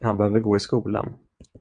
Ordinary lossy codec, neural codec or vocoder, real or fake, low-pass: MP3, 64 kbps; vocoder, 22.05 kHz, 80 mel bands, WaveNeXt; fake; 9.9 kHz